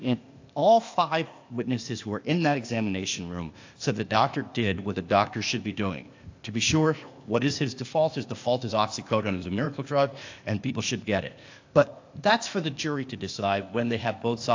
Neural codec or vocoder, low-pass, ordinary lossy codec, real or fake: codec, 16 kHz, 0.8 kbps, ZipCodec; 7.2 kHz; AAC, 48 kbps; fake